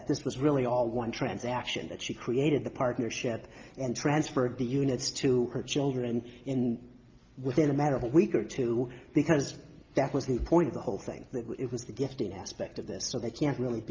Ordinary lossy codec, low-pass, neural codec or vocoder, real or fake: Opus, 24 kbps; 7.2 kHz; none; real